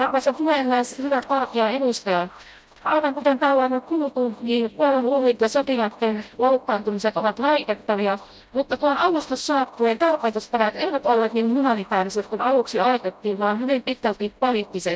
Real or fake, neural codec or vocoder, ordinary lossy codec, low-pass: fake; codec, 16 kHz, 0.5 kbps, FreqCodec, smaller model; none; none